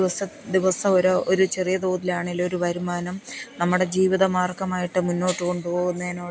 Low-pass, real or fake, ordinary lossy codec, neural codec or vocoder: none; real; none; none